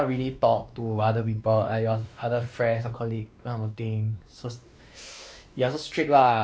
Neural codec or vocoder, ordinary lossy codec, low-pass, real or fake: codec, 16 kHz, 2 kbps, X-Codec, WavLM features, trained on Multilingual LibriSpeech; none; none; fake